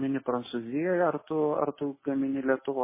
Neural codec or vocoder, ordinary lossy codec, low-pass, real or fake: none; MP3, 16 kbps; 3.6 kHz; real